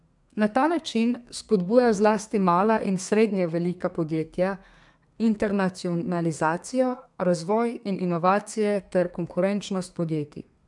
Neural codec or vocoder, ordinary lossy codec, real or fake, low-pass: codec, 32 kHz, 1.9 kbps, SNAC; MP3, 96 kbps; fake; 10.8 kHz